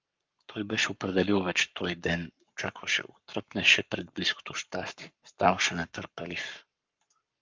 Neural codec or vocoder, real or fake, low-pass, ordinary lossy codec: codec, 44.1 kHz, 7.8 kbps, Pupu-Codec; fake; 7.2 kHz; Opus, 24 kbps